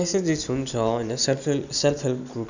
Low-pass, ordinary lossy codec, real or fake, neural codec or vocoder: 7.2 kHz; none; real; none